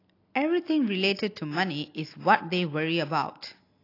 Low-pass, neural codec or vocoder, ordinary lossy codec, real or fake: 5.4 kHz; none; AAC, 32 kbps; real